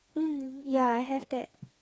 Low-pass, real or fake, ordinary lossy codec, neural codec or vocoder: none; fake; none; codec, 16 kHz, 4 kbps, FreqCodec, smaller model